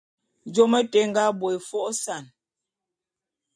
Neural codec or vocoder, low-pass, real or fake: none; 9.9 kHz; real